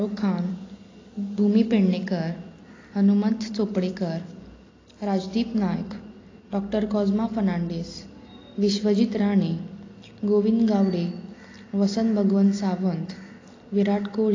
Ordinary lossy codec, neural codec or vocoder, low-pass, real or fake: AAC, 32 kbps; none; 7.2 kHz; real